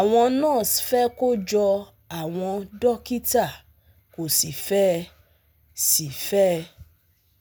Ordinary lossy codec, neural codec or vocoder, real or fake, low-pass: none; none; real; none